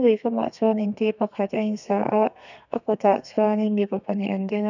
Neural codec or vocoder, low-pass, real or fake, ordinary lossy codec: codec, 32 kHz, 1.9 kbps, SNAC; 7.2 kHz; fake; none